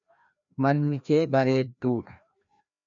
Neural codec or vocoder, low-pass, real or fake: codec, 16 kHz, 1 kbps, FreqCodec, larger model; 7.2 kHz; fake